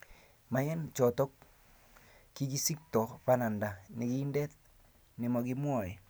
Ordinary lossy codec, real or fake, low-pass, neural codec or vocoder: none; real; none; none